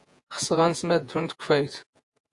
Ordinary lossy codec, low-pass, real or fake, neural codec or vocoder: AAC, 64 kbps; 10.8 kHz; fake; vocoder, 48 kHz, 128 mel bands, Vocos